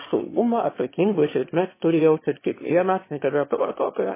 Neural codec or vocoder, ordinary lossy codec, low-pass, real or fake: autoencoder, 22.05 kHz, a latent of 192 numbers a frame, VITS, trained on one speaker; MP3, 16 kbps; 3.6 kHz; fake